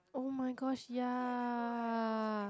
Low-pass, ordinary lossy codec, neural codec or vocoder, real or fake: none; none; none; real